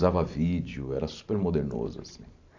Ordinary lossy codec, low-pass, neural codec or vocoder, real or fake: AAC, 48 kbps; 7.2 kHz; vocoder, 44.1 kHz, 128 mel bands every 512 samples, BigVGAN v2; fake